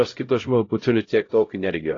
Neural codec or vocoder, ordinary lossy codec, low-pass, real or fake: codec, 16 kHz, 0.5 kbps, X-Codec, HuBERT features, trained on LibriSpeech; AAC, 32 kbps; 7.2 kHz; fake